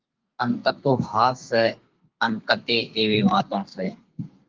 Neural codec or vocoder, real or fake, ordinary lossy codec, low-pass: codec, 32 kHz, 1.9 kbps, SNAC; fake; Opus, 32 kbps; 7.2 kHz